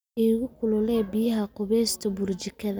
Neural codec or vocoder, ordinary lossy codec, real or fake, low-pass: none; none; real; none